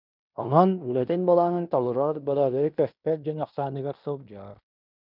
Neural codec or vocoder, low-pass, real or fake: codec, 16 kHz in and 24 kHz out, 0.9 kbps, LongCat-Audio-Codec, fine tuned four codebook decoder; 5.4 kHz; fake